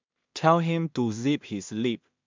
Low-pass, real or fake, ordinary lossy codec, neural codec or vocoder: 7.2 kHz; fake; MP3, 64 kbps; codec, 16 kHz in and 24 kHz out, 0.4 kbps, LongCat-Audio-Codec, two codebook decoder